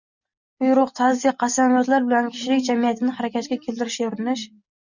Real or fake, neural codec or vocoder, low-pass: real; none; 7.2 kHz